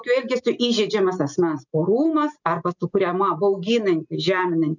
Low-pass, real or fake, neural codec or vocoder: 7.2 kHz; real; none